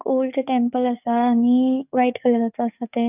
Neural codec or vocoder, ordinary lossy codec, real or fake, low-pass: codec, 44.1 kHz, 7.8 kbps, DAC; none; fake; 3.6 kHz